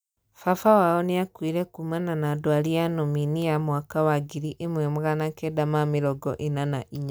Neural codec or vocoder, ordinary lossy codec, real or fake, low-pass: none; none; real; none